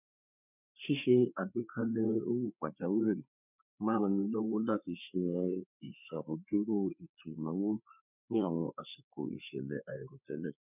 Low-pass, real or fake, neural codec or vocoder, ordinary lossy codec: 3.6 kHz; fake; codec, 16 kHz, 4 kbps, FreqCodec, larger model; none